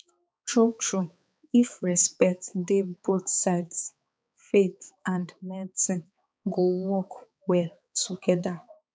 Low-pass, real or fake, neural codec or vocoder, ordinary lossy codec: none; fake; codec, 16 kHz, 4 kbps, X-Codec, HuBERT features, trained on balanced general audio; none